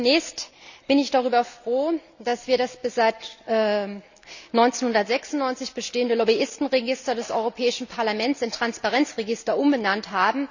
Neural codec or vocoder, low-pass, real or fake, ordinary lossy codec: none; 7.2 kHz; real; none